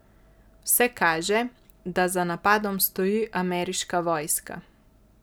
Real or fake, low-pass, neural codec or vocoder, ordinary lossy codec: real; none; none; none